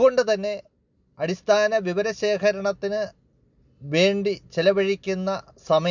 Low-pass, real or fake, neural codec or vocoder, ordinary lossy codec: 7.2 kHz; real; none; none